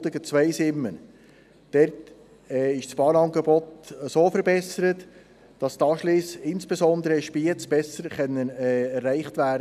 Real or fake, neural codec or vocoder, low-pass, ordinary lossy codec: real; none; 14.4 kHz; none